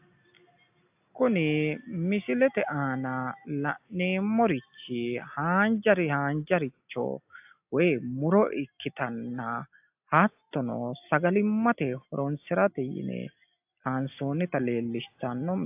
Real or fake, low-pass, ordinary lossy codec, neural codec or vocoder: real; 3.6 kHz; AAC, 32 kbps; none